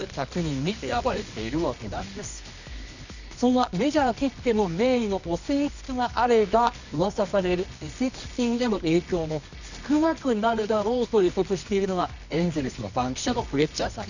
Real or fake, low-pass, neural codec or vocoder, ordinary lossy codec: fake; 7.2 kHz; codec, 24 kHz, 0.9 kbps, WavTokenizer, medium music audio release; none